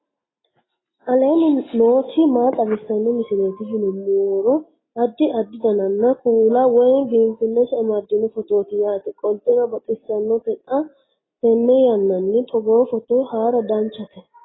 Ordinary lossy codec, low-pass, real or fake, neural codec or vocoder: AAC, 16 kbps; 7.2 kHz; real; none